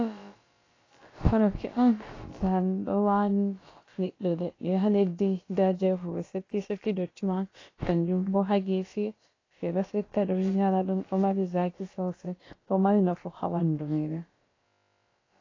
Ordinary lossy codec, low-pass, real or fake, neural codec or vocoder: AAC, 32 kbps; 7.2 kHz; fake; codec, 16 kHz, about 1 kbps, DyCAST, with the encoder's durations